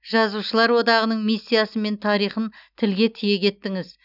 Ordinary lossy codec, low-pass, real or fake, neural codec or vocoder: none; 5.4 kHz; real; none